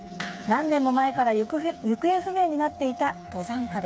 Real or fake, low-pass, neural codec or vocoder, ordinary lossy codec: fake; none; codec, 16 kHz, 4 kbps, FreqCodec, smaller model; none